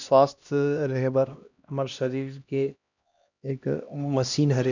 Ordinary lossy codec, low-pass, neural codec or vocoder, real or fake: none; 7.2 kHz; codec, 16 kHz, 1 kbps, X-Codec, HuBERT features, trained on LibriSpeech; fake